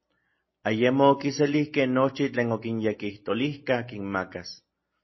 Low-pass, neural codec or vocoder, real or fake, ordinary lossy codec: 7.2 kHz; none; real; MP3, 24 kbps